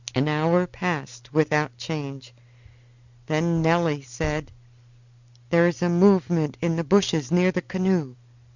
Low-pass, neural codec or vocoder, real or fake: 7.2 kHz; none; real